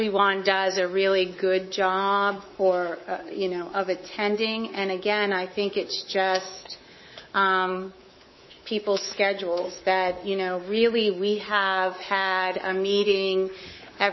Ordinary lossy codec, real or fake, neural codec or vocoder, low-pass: MP3, 24 kbps; fake; codec, 24 kHz, 3.1 kbps, DualCodec; 7.2 kHz